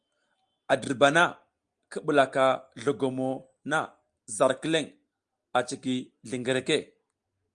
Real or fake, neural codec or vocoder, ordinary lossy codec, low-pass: real; none; Opus, 32 kbps; 9.9 kHz